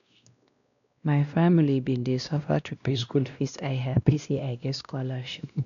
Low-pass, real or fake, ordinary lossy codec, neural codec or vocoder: 7.2 kHz; fake; none; codec, 16 kHz, 1 kbps, X-Codec, WavLM features, trained on Multilingual LibriSpeech